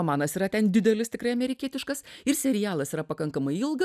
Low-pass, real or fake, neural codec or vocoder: 14.4 kHz; real; none